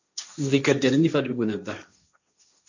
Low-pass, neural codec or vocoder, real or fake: 7.2 kHz; codec, 16 kHz, 1.1 kbps, Voila-Tokenizer; fake